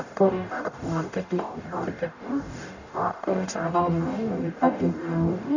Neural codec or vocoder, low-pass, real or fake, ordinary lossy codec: codec, 44.1 kHz, 0.9 kbps, DAC; 7.2 kHz; fake; none